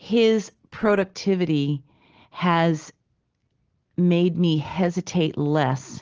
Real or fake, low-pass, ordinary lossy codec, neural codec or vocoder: real; 7.2 kHz; Opus, 16 kbps; none